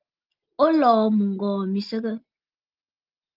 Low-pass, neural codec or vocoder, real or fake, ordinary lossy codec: 5.4 kHz; none; real; Opus, 32 kbps